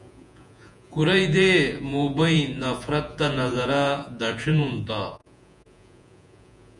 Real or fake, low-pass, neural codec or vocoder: fake; 10.8 kHz; vocoder, 48 kHz, 128 mel bands, Vocos